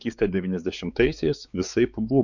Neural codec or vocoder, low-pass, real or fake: codec, 16 kHz, 4 kbps, X-Codec, WavLM features, trained on Multilingual LibriSpeech; 7.2 kHz; fake